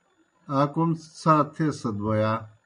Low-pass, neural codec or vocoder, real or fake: 9.9 kHz; none; real